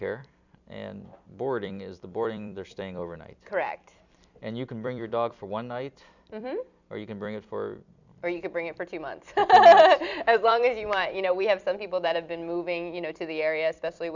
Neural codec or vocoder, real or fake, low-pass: vocoder, 44.1 kHz, 128 mel bands every 256 samples, BigVGAN v2; fake; 7.2 kHz